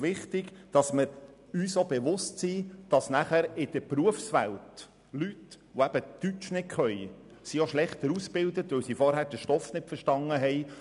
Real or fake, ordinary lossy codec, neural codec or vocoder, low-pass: real; none; none; 10.8 kHz